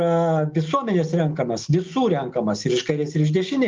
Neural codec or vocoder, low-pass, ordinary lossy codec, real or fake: none; 7.2 kHz; Opus, 32 kbps; real